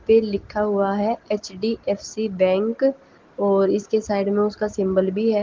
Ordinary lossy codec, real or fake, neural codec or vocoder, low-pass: Opus, 16 kbps; real; none; 7.2 kHz